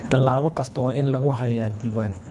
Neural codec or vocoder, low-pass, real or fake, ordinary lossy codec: codec, 24 kHz, 1.5 kbps, HILCodec; none; fake; none